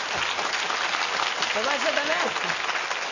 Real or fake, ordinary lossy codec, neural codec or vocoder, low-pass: real; none; none; 7.2 kHz